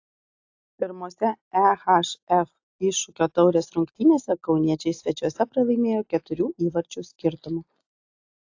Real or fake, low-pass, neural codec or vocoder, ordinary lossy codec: real; 7.2 kHz; none; AAC, 48 kbps